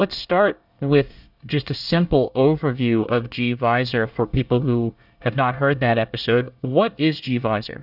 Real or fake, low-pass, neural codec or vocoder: fake; 5.4 kHz; codec, 24 kHz, 1 kbps, SNAC